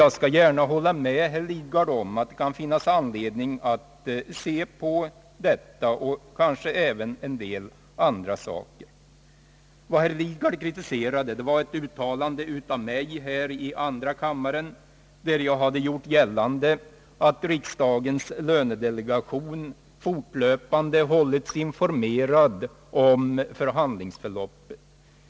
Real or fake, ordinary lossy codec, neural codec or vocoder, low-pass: real; none; none; none